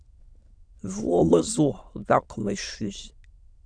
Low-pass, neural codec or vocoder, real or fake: 9.9 kHz; autoencoder, 22.05 kHz, a latent of 192 numbers a frame, VITS, trained on many speakers; fake